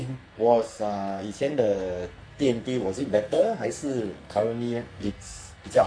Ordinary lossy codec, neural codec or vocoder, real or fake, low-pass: MP3, 48 kbps; codec, 44.1 kHz, 2.6 kbps, SNAC; fake; 9.9 kHz